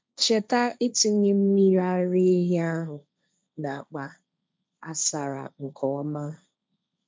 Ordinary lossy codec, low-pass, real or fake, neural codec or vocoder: none; none; fake; codec, 16 kHz, 1.1 kbps, Voila-Tokenizer